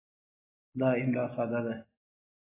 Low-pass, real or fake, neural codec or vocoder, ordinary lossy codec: 3.6 kHz; real; none; AAC, 16 kbps